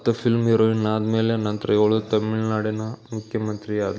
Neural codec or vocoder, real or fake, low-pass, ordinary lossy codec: none; real; none; none